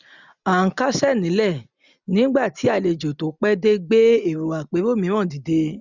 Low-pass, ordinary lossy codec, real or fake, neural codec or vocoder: 7.2 kHz; none; real; none